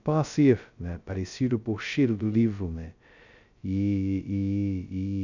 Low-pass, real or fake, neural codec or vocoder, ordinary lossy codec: 7.2 kHz; fake; codec, 16 kHz, 0.2 kbps, FocalCodec; none